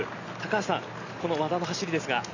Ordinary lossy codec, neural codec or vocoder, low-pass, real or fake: none; none; 7.2 kHz; real